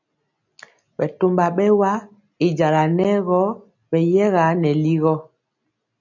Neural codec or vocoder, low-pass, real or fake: none; 7.2 kHz; real